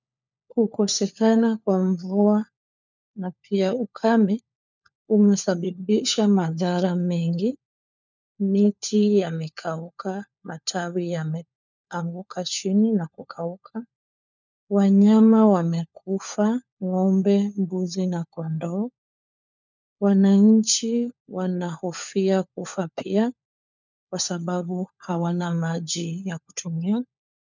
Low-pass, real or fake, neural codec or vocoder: 7.2 kHz; fake; codec, 16 kHz, 4 kbps, FunCodec, trained on LibriTTS, 50 frames a second